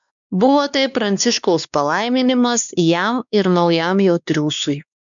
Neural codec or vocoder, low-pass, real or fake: codec, 16 kHz, 2 kbps, X-Codec, WavLM features, trained on Multilingual LibriSpeech; 7.2 kHz; fake